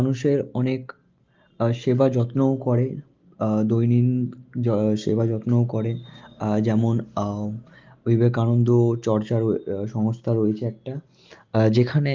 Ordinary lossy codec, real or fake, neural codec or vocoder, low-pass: Opus, 32 kbps; real; none; 7.2 kHz